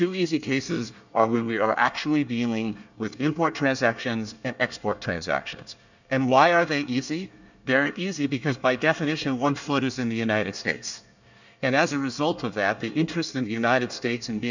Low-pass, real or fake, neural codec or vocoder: 7.2 kHz; fake; codec, 24 kHz, 1 kbps, SNAC